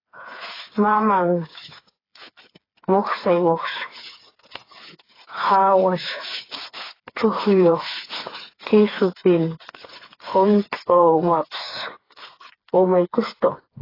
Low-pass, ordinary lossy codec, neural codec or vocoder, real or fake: 5.4 kHz; AAC, 24 kbps; codec, 16 kHz, 4 kbps, FreqCodec, smaller model; fake